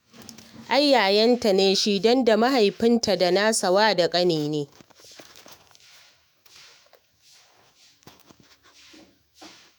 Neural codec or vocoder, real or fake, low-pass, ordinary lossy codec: autoencoder, 48 kHz, 128 numbers a frame, DAC-VAE, trained on Japanese speech; fake; none; none